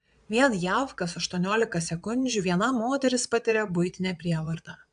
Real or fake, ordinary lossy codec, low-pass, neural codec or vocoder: fake; AAC, 96 kbps; 9.9 kHz; vocoder, 22.05 kHz, 80 mel bands, Vocos